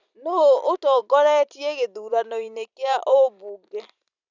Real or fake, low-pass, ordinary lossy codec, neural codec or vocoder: fake; 7.2 kHz; none; vocoder, 44.1 kHz, 128 mel bands every 256 samples, BigVGAN v2